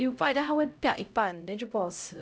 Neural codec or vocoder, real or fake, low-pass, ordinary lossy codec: codec, 16 kHz, 0.5 kbps, X-Codec, HuBERT features, trained on LibriSpeech; fake; none; none